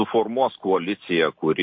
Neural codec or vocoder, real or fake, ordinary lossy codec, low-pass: none; real; MP3, 32 kbps; 7.2 kHz